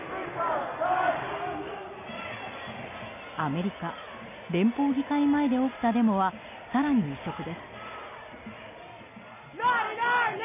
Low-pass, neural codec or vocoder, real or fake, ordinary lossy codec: 3.6 kHz; none; real; none